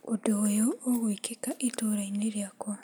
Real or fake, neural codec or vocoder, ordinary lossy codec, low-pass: real; none; none; none